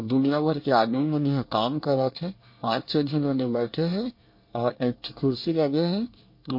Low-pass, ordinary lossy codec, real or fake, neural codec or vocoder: 5.4 kHz; MP3, 32 kbps; fake; codec, 24 kHz, 1 kbps, SNAC